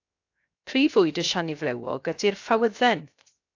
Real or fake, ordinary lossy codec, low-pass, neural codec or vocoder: fake; AAC, 48 kbps; 7.2 kHz; codec, 16 kHz, 0.7 kbps, FocalCodec